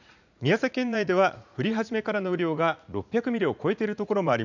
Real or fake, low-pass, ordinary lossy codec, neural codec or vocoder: fake; 7.2 kHz; none; vocoder, 44.1 kHz, 80 mel bands, Vocos